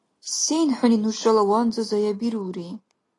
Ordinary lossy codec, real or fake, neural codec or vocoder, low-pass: AAC, 32 kbps; real; none; 10.8 kHz